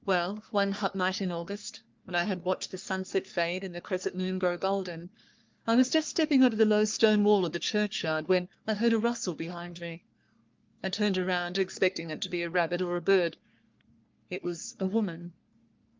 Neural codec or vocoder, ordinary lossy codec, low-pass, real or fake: codec, 44.1 kHz, 3.4 kbps, Pupu-Codec; Opus, 24 kbps; 7.2 kHz; fake